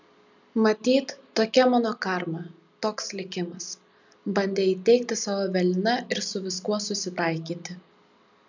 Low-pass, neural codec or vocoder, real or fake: 7.2 kHz; none; real